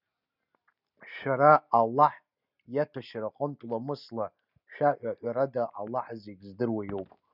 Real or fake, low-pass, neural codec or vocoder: real; 5.4 kHz; none